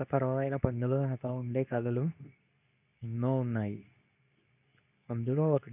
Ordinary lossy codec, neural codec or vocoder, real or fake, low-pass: none; codec, 24 kHz, 0.9 kbps, WavTokenizer, medium speech release version 2; fake; 3.6 kHz